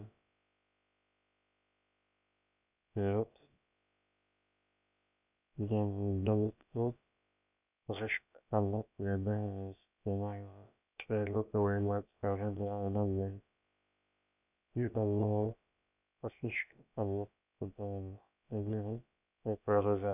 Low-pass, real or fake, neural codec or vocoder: 3.6 kHz; fake; codec, 16 kHz, about 1 kbps, DyCAST, with the encoder's durations